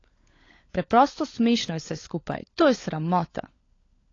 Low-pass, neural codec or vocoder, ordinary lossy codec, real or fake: 7.2 kHz; codec, 16 kHz, 16 kbps, FunCodec, trained on LibriTTS, 50 frames a second; AAC, 32 kbps; fake